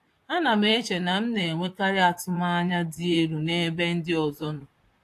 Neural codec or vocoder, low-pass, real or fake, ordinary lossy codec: vocoder, 44.1 kHz, 128 mel bands, Pupu-Vocoder; 14.4 kHz; fake; AAC, 64 kbps